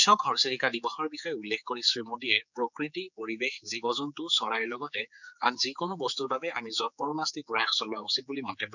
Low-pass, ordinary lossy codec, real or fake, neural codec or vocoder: 7.2 kHz; none; fake; codec, 16 kHz, 4 kbps, X-Codec, HuBERT features, trained on general audio